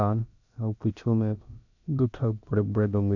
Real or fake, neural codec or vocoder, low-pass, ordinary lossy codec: fake; codec, 16 kHz, about 1 kbps, DyCAST, with the encoder's durations; 7.2 kHz; none